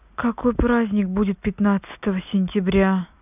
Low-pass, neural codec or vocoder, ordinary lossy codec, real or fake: 3.6 kHz; none; none; real